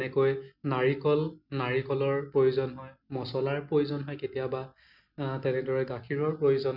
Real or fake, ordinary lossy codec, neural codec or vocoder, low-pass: real; none; none; 5.4 kHz